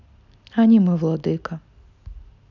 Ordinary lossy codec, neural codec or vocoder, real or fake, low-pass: none; none; real; 7.2 kHz